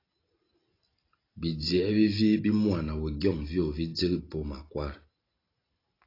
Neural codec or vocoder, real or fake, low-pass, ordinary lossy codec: none; real; 5.4 kHz; AAC, 24 kbps